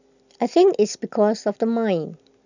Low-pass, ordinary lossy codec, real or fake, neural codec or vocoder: 7.2 kHz; none; real; none